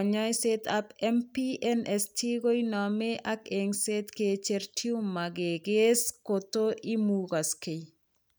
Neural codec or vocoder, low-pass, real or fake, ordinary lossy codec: none; none; real; none